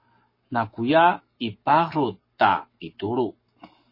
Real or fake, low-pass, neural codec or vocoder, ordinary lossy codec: fake; 5.4 kHz; codec, 44.1 kHz, 7.8 kbps, Pupu-Codec; MP3, 24 kbps